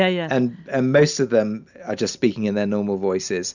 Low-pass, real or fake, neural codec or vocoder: 7.2 kHz; real; none